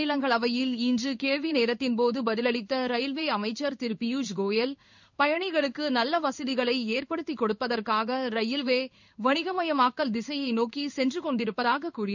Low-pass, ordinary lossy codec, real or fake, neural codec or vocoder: 7.2 kHz; none; fake; codec, 16 kHz in and 24 kHz out, 1 kbps, XY-Tokenizer